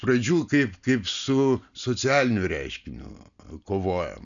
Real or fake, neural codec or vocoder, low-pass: real; none; 7.2 kHz